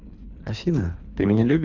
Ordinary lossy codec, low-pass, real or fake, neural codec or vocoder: none; 7.2 kHz; fake; codec, 24 kHz, 3 kbps, HILCodec